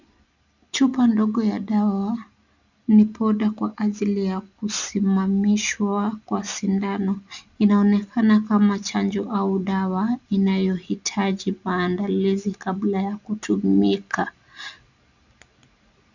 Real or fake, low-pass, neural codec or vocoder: real; 7.2 kHz; none